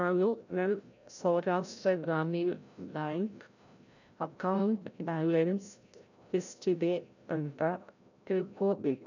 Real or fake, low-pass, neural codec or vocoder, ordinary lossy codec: fake; 7.2 kHz; codec, 16 kHz, 0.5 kbps, FreqCodec, larger model; none